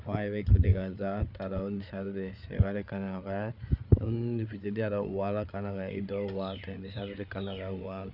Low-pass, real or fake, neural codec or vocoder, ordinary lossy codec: 5.4 kHz; fake; codec, 16 kHz, 8 kbps, FreqCodec, larger model; none